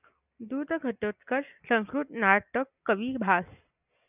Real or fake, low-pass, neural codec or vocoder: real; 3.6 kHz; none